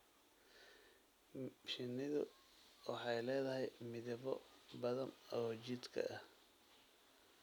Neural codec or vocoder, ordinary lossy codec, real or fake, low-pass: none; none; real; none